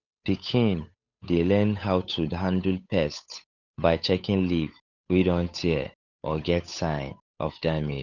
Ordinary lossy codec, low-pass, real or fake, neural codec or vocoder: none; 7.2 kHz; fake; codec, 16 kHz, 8 kbps, FunCodec, trained on Chinese and English, 25 frames a second